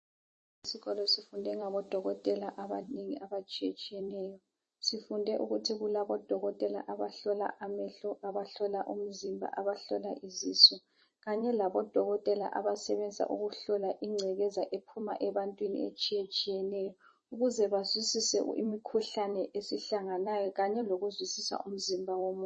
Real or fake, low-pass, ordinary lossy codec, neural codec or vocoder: fake; 10.8 kHz; MP3, 32 kbps; vocoder, 44.1 kHz, 128 mel bands every 256 samples, BigVGAN v2